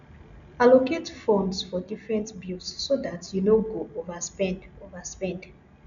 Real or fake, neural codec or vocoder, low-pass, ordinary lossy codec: real; none; 7.2 kHz; none